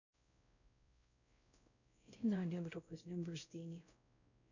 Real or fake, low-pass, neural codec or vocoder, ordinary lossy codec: fake; 7.2 kHz; codec, 16 kHz, 0.5 kbps, X-Codec, WavLM features, trained on Multilingual LibriSpeech; none